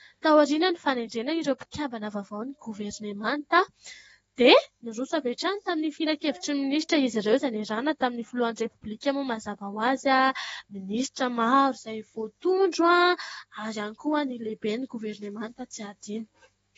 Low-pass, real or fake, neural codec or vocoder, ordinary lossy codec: 19.8 kHz; fake; autoencoder, 48 kHz, 128 numbers a frame, DAC-VAE, trained on Japanese speech; AAC, 24 kbps